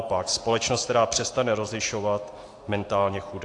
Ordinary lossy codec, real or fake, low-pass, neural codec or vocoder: AAC, 48 kbps; real; 10.8 kHz; none